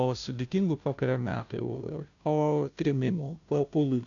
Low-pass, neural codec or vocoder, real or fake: 7.2 kHz; codec, 16 kHz, 0.5 kbps, FunCodec, trained on Chinese and English, 25 frames a second; fake